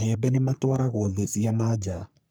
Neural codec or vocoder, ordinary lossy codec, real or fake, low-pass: codec, 44.1 kHz, 3.4 kbps, Pupu-Codec; none; fake; none